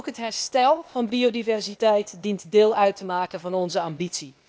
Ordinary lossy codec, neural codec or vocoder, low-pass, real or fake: none; codec, 16 kHz, 0.8 kbps, ZipCodec; none; fake